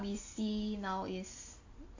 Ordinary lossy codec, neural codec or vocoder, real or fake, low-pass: AAC, 48 kbps; none; real; 7.2 kHz